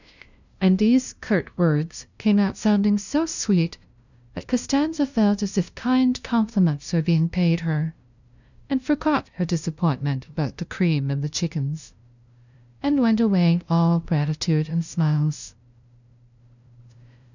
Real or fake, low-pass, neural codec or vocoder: fake; 7.2 kHz; codec, 16 kHz, 0.5 kbps, FunCodec, trained on LibriTTS, 25 frames a second